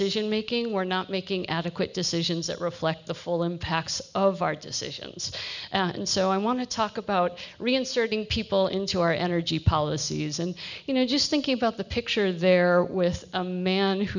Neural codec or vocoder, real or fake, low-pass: none; real; 7.2 kHz